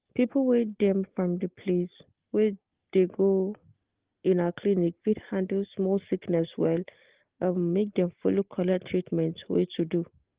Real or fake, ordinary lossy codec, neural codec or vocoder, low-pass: real; Opus, 16 kbps; none; 3.6 kHz